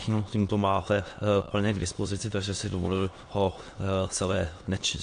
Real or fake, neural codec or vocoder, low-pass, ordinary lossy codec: fake; autoencoder, 22.05 kHz, a latent of 192 numbers a frame, VITS, trained on many speakers; 9.9 kHz; AAC, 48 kbps